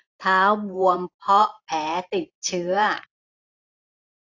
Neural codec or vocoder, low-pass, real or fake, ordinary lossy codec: vocoder, 44.1 kHz, 128 mel bands every 512 samples, BigVGAN v2; 7.2 kHz; fake; AAC, 48 kbps